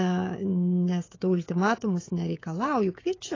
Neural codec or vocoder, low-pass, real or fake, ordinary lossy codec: codec, 16 kHz, 16 kbps, FreqCodec, smaller model; 7.2 kHz; fake; AAC, 32 kbps